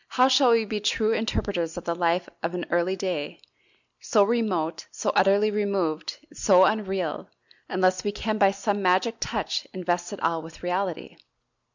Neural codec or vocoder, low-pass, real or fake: none; 7.2 kHz; real